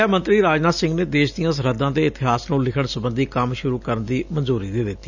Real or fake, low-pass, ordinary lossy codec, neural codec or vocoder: real; 7.2 kHz; none; none